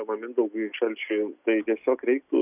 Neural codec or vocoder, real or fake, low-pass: none; real; 3.6 kHz